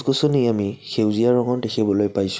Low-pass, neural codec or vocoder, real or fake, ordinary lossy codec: none; none; real; none